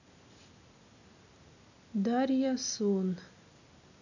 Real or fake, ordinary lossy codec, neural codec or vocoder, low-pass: real; none; none; 7.2 kHz